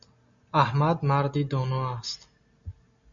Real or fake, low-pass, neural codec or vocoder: real; 7.2 kHz; none